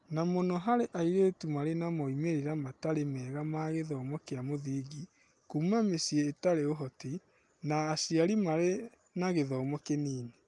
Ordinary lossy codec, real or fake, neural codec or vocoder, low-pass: Opus, 32 kbps; real; none; 10.8 kHz